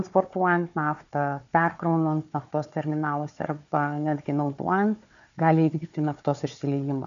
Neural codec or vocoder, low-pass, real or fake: codec, 16 kHz, 4 kbps, FunCodec, trained on Chinese and English, 50 frames a second; 7.2 kHz; fake